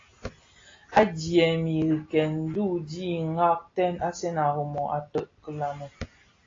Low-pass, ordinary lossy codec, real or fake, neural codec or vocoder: 7.2 kHz; AAC, 32 kbps; real; none